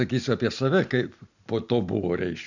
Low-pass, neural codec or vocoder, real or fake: 7.2 kHz; none; real